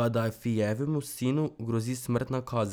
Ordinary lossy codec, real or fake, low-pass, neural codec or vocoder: none; real; none; none